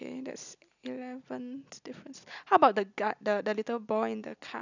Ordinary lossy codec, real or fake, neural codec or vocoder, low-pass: none; real; none; 7.2 kHz